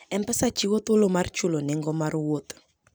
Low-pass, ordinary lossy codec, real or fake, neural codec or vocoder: none; none; real; none